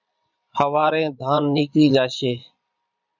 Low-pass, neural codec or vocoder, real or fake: 7.2 kHz; vocoder, 22.05 kHz, 80 mel bands, Vocos; fake